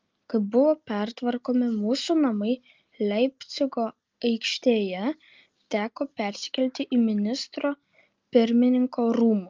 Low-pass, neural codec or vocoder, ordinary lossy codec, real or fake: 7.2 kHz; none; Opus, 32 kbps; real